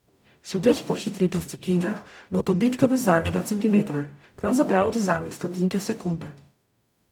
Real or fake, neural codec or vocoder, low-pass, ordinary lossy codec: fake; codec, 44.1 kHz, 0.9 kbps, DAC; 19.8 kHz; none